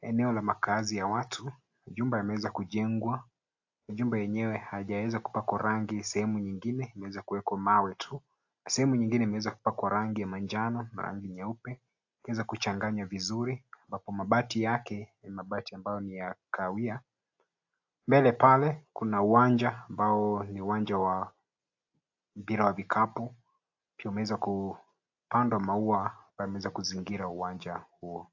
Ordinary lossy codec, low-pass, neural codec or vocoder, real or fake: AAC, 48 kbps; 7.2 kHz; none; real